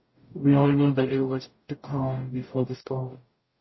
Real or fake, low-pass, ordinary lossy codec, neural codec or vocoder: fake; 7.2 kHz; MP3, 24 kbps; codec, 44.1 kHz, 0.9 kbps, DAC